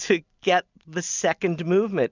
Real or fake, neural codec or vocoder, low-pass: real; none; 7.2 kHz